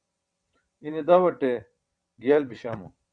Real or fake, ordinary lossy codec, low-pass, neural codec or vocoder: fake; Opus, 64 kbps; 9.9 kHz; vocoder, 22.05 kHz, 80 mel bands, WaveNeXt